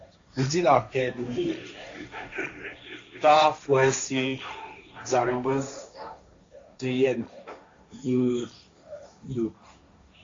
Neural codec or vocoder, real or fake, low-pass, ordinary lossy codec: codec, 16 kHz, 1.1 kbps, Voila-Tokenizer; fake; 7.2 kHz; AAC, 48 kbps